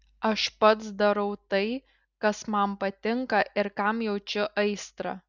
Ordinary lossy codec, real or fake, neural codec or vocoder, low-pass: Opus, 64 kbps; real; none; 7.2 kHz